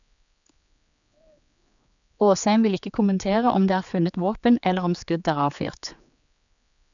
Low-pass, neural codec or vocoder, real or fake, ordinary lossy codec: 7.2 kHz; codec, 16 kHz, 4 kbps, X-Codec, HuBERT features, trained on general audio; fake; none